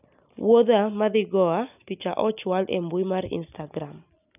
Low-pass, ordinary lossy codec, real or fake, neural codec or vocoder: 3.6 kHz; none; real; none